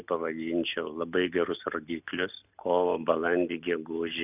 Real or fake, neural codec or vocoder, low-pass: real; none; 3.6 kHz